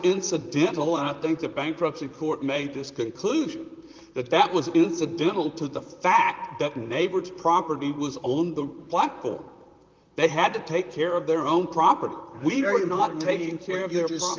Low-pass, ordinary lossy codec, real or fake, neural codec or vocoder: 7.2 kHz; Opus, 24 kbps; fake; vocoder, 44.1 kHz, 128 mel bands, Pupu-Vocoder